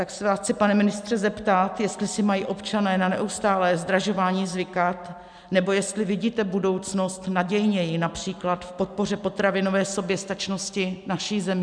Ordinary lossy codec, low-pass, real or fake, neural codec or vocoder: MP3, 96 kbps; 9.9 kHz; real; none